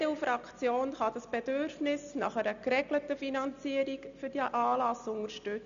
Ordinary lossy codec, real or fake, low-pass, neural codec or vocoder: none; real; 7.2 kHz; none